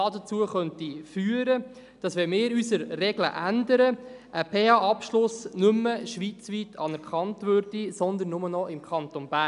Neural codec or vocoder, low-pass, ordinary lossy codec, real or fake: none; 10.8 kHz; none; real